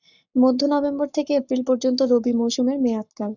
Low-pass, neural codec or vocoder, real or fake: 7.2 kHz; codec, 44.1 kHz, 7.8 kbps, Pupu-Codec; fake